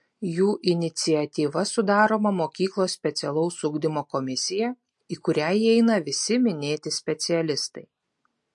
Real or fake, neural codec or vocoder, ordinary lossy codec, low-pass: real; none; MP3, 48 kbps; 10.8 kHz